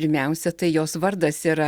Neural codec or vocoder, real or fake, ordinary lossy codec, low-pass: none; real; Opus, 64 kbps; 19.8 kHz